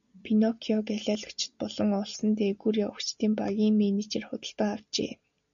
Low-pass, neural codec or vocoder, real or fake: 7.2 kHz; none; real